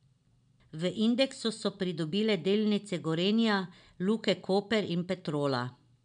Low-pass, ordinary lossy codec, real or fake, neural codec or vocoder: 9.9 kHz; none; real; none